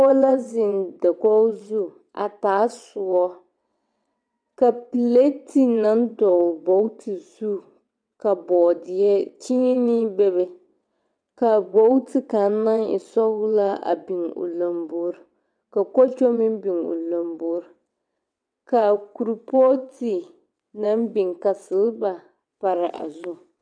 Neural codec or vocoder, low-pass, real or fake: vocoder, 22.05 kHz, 80 mel bands, WaveNeXt; 9.9 kHz; fake